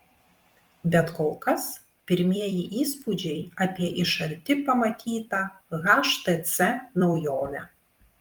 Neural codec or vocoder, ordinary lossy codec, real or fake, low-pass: vocoder, 44.1 kHz, 128 mel bands every 256 samples, BigVGAN v2; Opus, 32 kbps; fake; 19.8 kHz